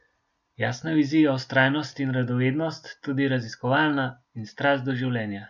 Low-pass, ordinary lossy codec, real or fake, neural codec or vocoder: 7.2 kHz; none; real; none